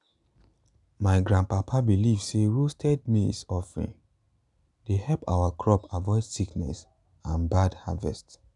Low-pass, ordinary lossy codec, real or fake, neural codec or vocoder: 10.8 kHz; none; real; none